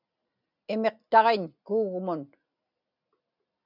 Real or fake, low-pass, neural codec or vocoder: real; 5.4 kHz; none